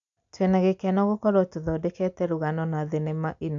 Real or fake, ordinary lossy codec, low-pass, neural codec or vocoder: real; MP3, 64 kbps; 7.2 kHz; none